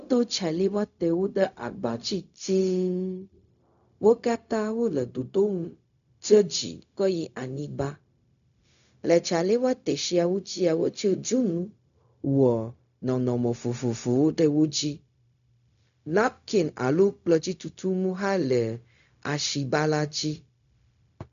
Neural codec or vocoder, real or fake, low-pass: codec, 16 kHz, 0.4 kbps, LongCat-Audio-Codec; fake; 7.2 kHz